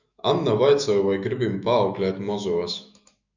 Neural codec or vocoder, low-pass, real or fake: autoencoder, 48 kHz, 128 numbers a frame, DAC-VAE, trained on Japanese speech; 7.2 kHz; fake